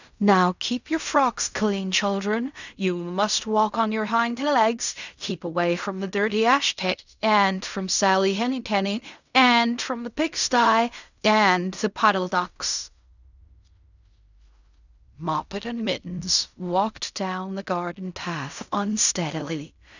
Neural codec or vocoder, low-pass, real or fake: codec, 16 kHz in and 24 kHz out, 0.4 kbps, LongCat-Audio-Codec, fine tuned four codebook decoder; 7.2 kHz; fake